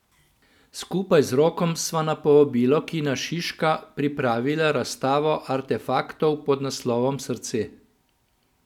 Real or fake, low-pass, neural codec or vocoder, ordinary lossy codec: real; 19.8 kHz; none; none